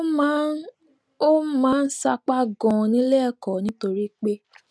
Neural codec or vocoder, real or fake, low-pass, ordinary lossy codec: none; real; none; none